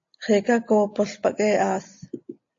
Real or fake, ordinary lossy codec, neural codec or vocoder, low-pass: real; AAC, 48 kbps; none; 7.2 kHz